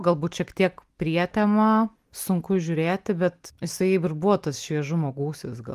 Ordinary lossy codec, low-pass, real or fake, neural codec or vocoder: Opus, 24 kbps; 14.4 kHz; real; none